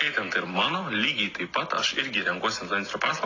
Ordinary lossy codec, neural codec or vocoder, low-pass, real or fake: AAC, 32 kbps; none; 7.2 kHz; real